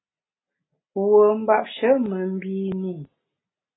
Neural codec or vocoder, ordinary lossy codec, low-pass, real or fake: none; AAC, 16 kbps; 7.2 kHz; real